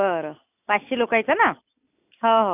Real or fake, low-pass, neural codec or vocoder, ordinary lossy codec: real; 3.6 kHz; none; none